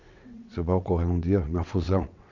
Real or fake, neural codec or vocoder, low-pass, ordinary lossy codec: fake; vocoder, 22.05 kHz, 80 mel bands, WaveNeXt; 7.2 kHz; none